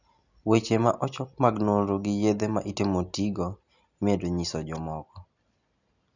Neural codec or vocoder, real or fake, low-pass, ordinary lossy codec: none; real; 7.2 kHz; none